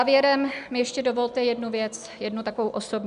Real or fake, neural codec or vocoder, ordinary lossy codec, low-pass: real; none; MP3, 96 kbps; 10.8 kHz